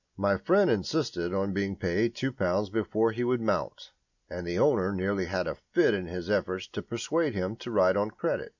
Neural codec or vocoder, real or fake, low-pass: none; real; 7.2 kHz